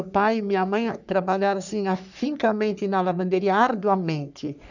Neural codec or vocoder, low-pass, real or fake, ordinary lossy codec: codec, 44.1 kHz, 3.4 kbps, Pupu-Codec; 7.2 kHz; fake; none